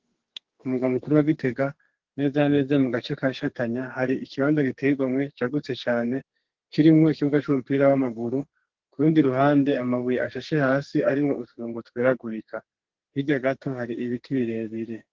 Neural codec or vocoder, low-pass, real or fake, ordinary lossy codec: codec, 32 kHz, 1.9 kbps, SNAC; 7.2 kHz; fake; Opus, 16 kbps